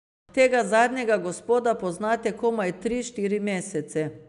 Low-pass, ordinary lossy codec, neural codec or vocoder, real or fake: 10.8 kHz; MP3, 96 kbps; none; real